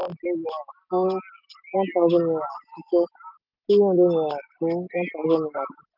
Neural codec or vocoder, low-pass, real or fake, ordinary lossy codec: none; 5.4 kHz; real; none